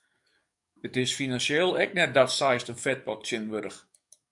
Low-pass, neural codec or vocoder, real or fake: 10.8 kHz; codec, 44.1 kHz, 7.8 kbps, DAC; fake